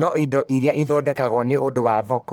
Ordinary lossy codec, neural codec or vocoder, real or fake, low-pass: none; codec, 44.1 kHz, 1.7 kbps, Pupu-Codec; fake; none